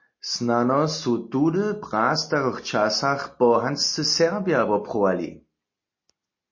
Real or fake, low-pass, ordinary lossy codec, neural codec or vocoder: real; 7.2 kHz; MP3, 32 kbps; none